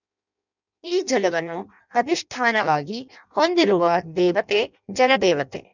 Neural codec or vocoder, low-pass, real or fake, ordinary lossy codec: codec, 16 kHz in and 24 kHz out, 0.6 kbps, FireRedTTS-2 codec; 7.2 kHz; fake; none